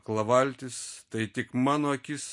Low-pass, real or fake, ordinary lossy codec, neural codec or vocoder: 10.8 kHz; real; MP3, 48 kbps; none